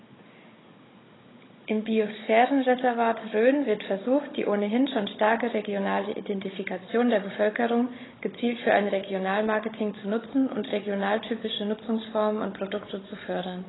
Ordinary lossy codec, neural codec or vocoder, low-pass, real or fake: AAC, 16 kbps; codec, 16 kHz, 16 kbps, FunCodec, trained on Chinese and English, 50 frames a second; 7.2 kHz; fake